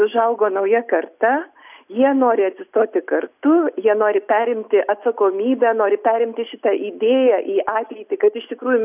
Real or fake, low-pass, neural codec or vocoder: real; 3.6 kHz; none